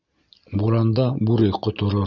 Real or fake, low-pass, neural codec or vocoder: real; 7.2 kHz; none